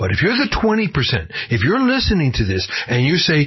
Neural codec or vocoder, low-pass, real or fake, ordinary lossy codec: none; 7.2 kHz; real; MP3, 24 kbps